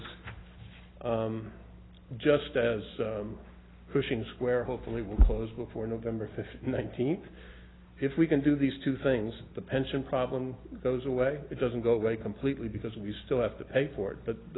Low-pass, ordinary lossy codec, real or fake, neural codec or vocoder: 7.2 kHz; AAC, 16 kbps; real; none